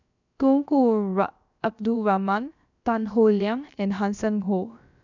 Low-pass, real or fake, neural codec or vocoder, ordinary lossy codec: 7.2 kHz; fake; codec, 16 kHz, about 1 kbps, DyCAST, with the encoder's durations; none